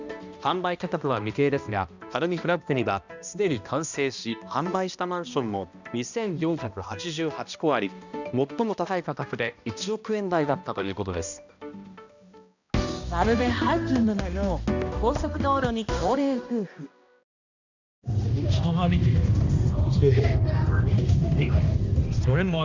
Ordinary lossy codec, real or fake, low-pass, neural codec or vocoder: none; fake; 7.2 kHz; codec, 16 kHz, 1 kbps, X-Codec, HuBERT features, trained on balanced general audio